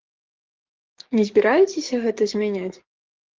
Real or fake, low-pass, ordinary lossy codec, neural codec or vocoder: fake; 7.2 kHz; Opus, 16 kbps; codec, 44.1 kHz, 7.8 kbps, DAC